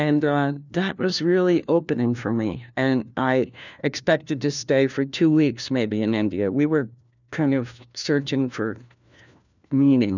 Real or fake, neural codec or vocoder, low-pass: fake; codec, 16 kHz, 1 kbps, FunCodec, trained on LibriTTS, 50 frames a second; 7.2 kHz